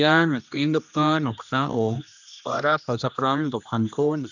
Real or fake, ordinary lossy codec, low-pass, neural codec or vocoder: fake; none; 7.2 kHz; codec, 16 kHz, 1 kbps, X-Codec, HuBERT features, trained on general audio